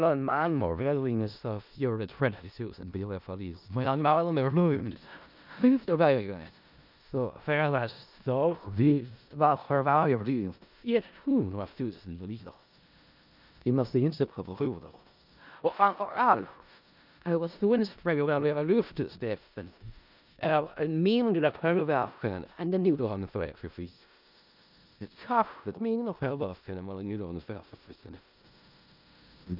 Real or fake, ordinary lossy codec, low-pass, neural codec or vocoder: fake; none; 5.4 kHz; codec, 16 kHz in and 24 kHz out, 0.4 kbps, LongCat-Audio-Codec, four codebook decoder